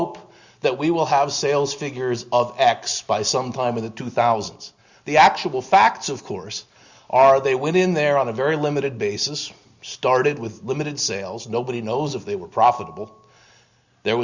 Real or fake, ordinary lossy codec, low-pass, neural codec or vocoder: real; Opus, 64 kbps; 7.2 kHz; none